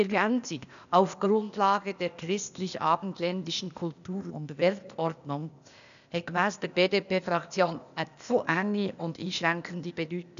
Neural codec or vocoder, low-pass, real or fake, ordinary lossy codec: codec, 16 kHz, 0.8 kbps, ZipCodec; 7.2 kHz; fake; none